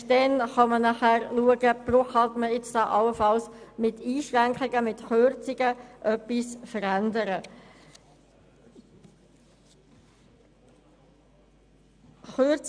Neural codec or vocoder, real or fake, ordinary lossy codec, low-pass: none; real; none; 9.9 kHz